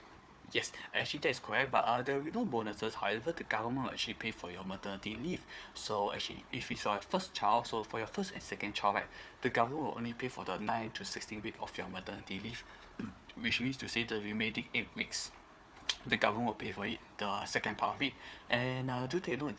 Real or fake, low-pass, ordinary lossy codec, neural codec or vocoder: fake; none; none; codec, 16 kHz, 4 kbps, FunCodec, trained on Chinese and English, 50 frames a second